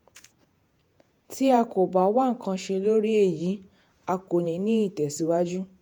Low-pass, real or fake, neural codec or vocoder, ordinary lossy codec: 19.8 kHz; fake; vocoder, 48 kHz, 128 mel bands, Vocos; MP3, 96 kbps